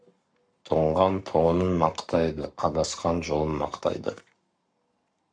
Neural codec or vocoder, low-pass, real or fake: codec, 44.1 kHz, 7.8 kbps, Pupu-Codec; 9.9 kHz; fake